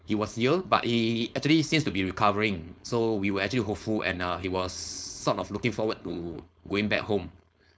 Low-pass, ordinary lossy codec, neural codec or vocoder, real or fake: none; none; codec, 16 kHz, 4.8 kbps, FACodec; fake